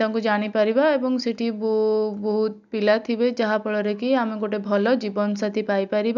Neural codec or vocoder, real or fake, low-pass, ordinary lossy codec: none; real; 7.2 kHz; none